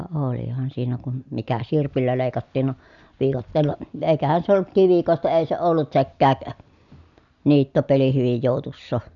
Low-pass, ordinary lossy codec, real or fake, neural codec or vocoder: 7.2 kHz; none; real; none